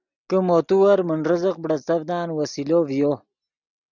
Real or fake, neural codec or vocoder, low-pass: real; none; 7.2 kHz